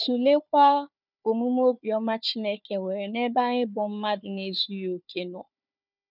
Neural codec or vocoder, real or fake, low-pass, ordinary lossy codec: codec, 16 kHz, 4 kbps, FunCodec, trained on Chinese and English, 50 frames a second; fake; 5.4 kHz; none